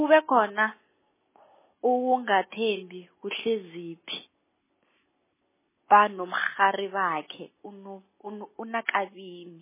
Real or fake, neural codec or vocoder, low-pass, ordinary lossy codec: real; none; 3.6 kHz; MP3, 16 kbps